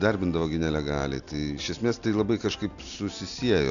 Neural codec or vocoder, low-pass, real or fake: none; 7.2 kHz; real